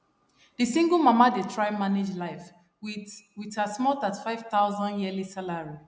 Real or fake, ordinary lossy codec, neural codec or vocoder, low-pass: real; none; none; none